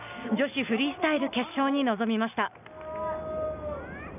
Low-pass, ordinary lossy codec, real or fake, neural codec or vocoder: 3.6 kHz; none; real; none